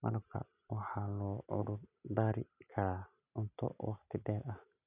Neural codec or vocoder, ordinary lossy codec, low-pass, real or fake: none; none; 3.6 kHz; real